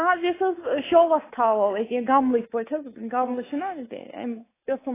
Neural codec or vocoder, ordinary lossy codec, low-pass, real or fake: codec, 24 kHz, 3.1 kbps, DualCodec; AAC, 16 kbps; 3.6 kHz; fake